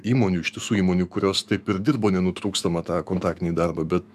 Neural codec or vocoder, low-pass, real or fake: autoencoder, 48 kHz, 128 numbers a frame, DAC-VAE, trained on Japanese speech; 14.4 kHz; fake